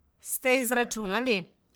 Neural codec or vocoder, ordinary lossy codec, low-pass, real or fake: codec, 44.1 kHz, 1.7 kbps, Pupu-Codec; none; none; fake